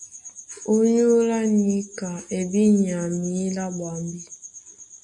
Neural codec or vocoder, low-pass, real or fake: none; 10.8 kHz; real